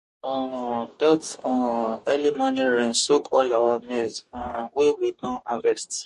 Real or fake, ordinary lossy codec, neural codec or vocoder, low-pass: fake; MP3, 48 kbps; codec, 44.1 kHz, 2.6 kbps, DAC; 14.4 kHz